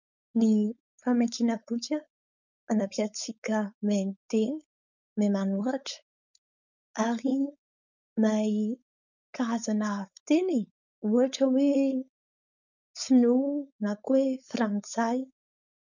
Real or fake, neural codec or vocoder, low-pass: fake; codec, 16 kHz, 4.8 kbps, FACodec; 7.2 kHz